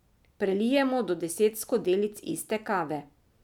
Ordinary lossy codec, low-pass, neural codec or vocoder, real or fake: none; 19.8 kHz; none; real